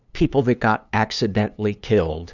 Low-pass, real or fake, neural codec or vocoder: 7.2 kHz; fake; codec, 16 kHz, 2 kbps, FunCodec, trained on LibriTTS, 25 frames a second